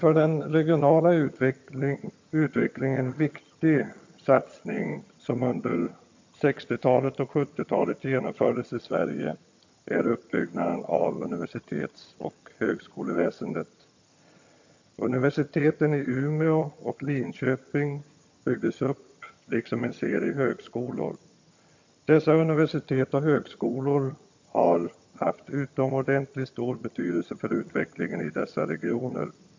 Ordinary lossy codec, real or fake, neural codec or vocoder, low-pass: MP3, 48 kbps; fake; vocoder, 22.05 kHz, 80 mel bands, HiFi-GAN; 7.2 kHz